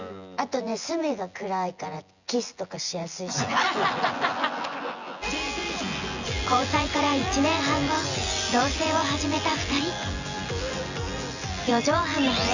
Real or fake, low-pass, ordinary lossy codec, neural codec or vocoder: fake; 7.2 kHz; Opus, 64 kbps; vocoder, 24 kHz, 100 mel bands, Vocos